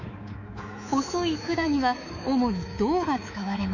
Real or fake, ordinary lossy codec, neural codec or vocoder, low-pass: fake; none; codec, 24 kHz, 3.1 kbps, DualCodec; 7.2 kHz